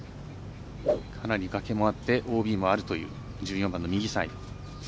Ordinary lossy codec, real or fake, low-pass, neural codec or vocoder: none; real; none; none